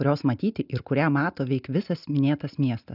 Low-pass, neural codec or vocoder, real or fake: 5.4 kHz; none; real